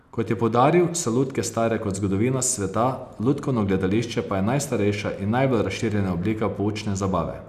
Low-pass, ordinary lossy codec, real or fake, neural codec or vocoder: 14.4 kHz; none; real; none